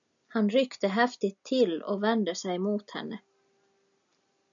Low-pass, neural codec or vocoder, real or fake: 7.2 kHz; none; real